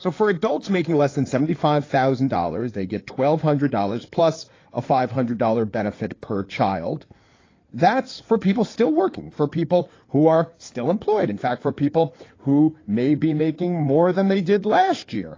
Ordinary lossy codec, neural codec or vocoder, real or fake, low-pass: AAC, 32 kbps; codec, 16 kHz in and 24 kHz out, 2.2 kbps, FireRedTTS-2 codec; fake; 7.2 kHz